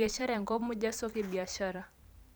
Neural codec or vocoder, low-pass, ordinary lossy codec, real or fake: vocoder, 44.1 kHz, 128 mel bands every 512 samples, BigVGAN v2; none; none; fake